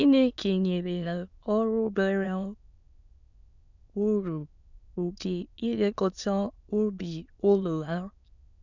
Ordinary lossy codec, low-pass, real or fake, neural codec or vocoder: none; 7.2 kHz; fake; autoencoder, 22.05 kHz, a latent of 192 numbers a frame, VITS, trained on many speakers